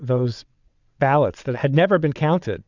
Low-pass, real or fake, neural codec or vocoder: 7.2 kHz; fake; vocoder, 22.05 kHz, 80 mel bands, WaveNeXt